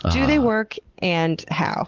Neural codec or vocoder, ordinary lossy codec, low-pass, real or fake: none; Opus, 16 kbps; 7.2 kHz; real